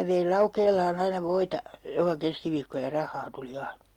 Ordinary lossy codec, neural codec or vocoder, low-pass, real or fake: Opus, 32 kbps; none; 19.8 kHz; real